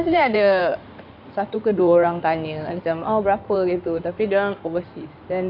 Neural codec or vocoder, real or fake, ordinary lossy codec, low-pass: codec, 16 kHz in and 24 kHz out, 2.2 kbps, FireRedTTS-2 codec; fake; none; 5.4 kHz